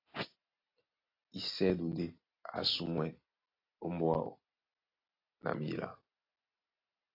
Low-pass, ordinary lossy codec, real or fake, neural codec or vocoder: 5.4 kHz; AAC, 32 kbps; real; none